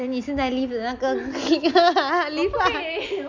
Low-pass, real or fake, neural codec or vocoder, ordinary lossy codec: 7.2 kHz; real; none; none